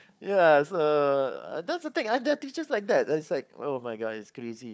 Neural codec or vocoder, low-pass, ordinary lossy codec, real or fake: codec, 16 kHz, 4 kbps, FunCodec, trained on Chinese and English, 50 frames a second; none; none; fake